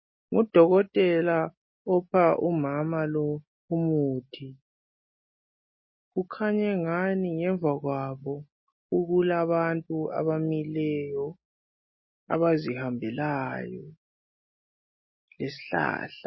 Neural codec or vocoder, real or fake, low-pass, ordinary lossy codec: none; real; 7.2 kHz; MP3, 24 kbps